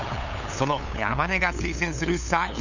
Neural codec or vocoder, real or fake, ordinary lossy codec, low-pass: codec, 16 kHz, 8 kbps, FunCodec, trained on LibriTTS, 25 frames a second; fake; none; 7.2 kHz